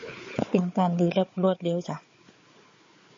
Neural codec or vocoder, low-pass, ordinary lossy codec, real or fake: codec, 16 kHz, 4 kbps, FunCodec, trained on Chinese and English, 50 frames a second; 7.2 kHz; MP3, 32 kbps; fake